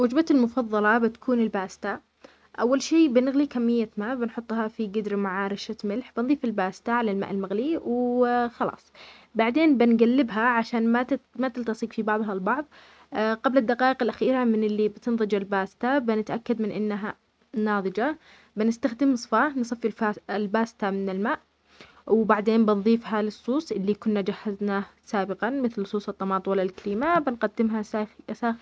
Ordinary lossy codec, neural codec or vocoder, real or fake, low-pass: none; none; real; none